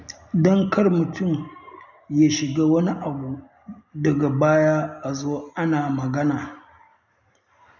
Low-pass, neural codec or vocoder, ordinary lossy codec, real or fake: 7.2 kHz; none; none; real